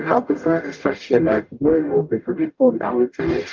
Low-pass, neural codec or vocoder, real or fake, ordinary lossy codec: 7.2 kHz; codec, 44.1 kHz, 0.9 kbps, DAC; fake; Opus, 24 kbps